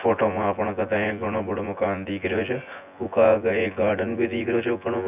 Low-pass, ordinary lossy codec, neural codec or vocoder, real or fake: 3.6 kHz; none; vocoder, 24 kHz, 100 mel bands, Vocos; fake